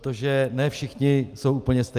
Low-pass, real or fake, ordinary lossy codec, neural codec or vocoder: 14.4 kHz; real; Opus, 32 kbps; none